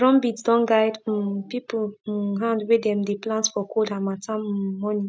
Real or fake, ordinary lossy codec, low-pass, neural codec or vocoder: real; none; none; none